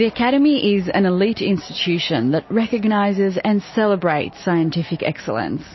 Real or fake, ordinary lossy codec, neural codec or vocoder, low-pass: real; MP3, 24 kbps; none; 7.2 kHz